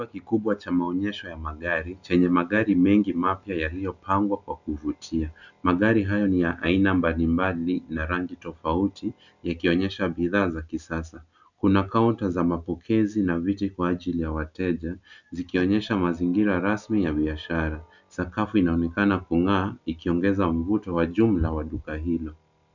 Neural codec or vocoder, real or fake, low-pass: none; real; 7.2 kHz